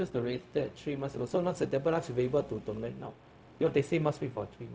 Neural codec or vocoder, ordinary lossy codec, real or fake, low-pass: codec, 16 kHz, 0.4 kbps, LongCat-Audio-Codec; none; fake; none